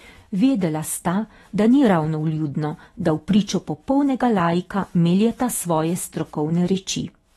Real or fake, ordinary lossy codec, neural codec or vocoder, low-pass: fake; AAC, 32 kbps; autoencoder, 48 kHz, 128 numbers a frame, DAC-VAE, trained on Japanese speech; 19.8 kHz